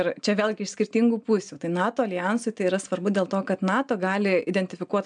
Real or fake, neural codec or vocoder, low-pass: real; none; 9.9 kHz